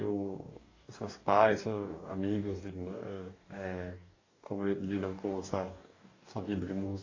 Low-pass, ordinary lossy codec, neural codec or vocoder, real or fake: 7.2 kHz; AAC, 32 kbps; codec, 44.1 kHz, 2.6 kbps, DAC; fake